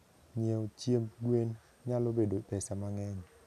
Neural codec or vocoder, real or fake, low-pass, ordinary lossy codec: none; real; 14.4 kHz; none